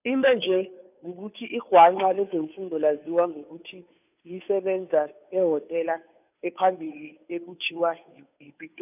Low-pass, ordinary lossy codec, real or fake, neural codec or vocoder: 3.6 kHz; none; fake; codec, 16 kHz, 2 kbps, FunCodec, trained on Chinese and English, 25 frames a second